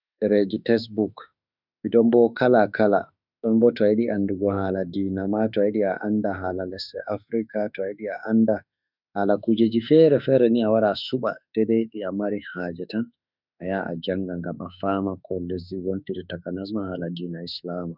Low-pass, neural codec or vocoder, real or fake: 5.4 kHz; autoencoder, 48 kHz, 32 numbers a frame, DAC-VAE, trained on Japanese speech; fake